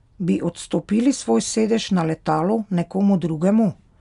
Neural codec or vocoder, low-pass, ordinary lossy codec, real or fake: none; 10.8 kHz; none; real